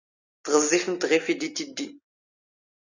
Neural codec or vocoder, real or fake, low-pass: none; real; 7.2 kHz